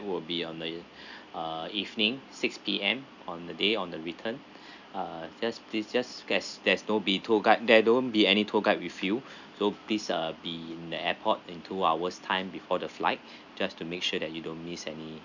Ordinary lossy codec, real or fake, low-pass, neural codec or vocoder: none; real; 7.2 kHz; none